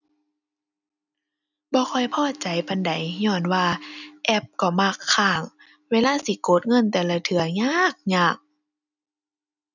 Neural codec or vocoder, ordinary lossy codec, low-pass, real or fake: none; none; 7.2 kHz; real